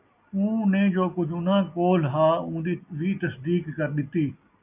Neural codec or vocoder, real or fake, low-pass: none; real; 3.6 kHz